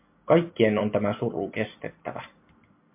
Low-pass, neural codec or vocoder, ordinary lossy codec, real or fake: 3.6 kHz; none; MP3, 32 kbps; real